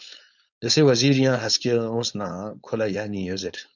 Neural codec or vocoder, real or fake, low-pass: codec, 16 kHz, 4.8 kbps, FACodec; fake; 7.2 kHz